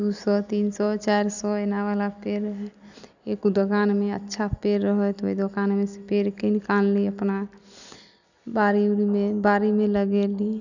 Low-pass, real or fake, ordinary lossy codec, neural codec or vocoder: 7.2 kHz; real; none; none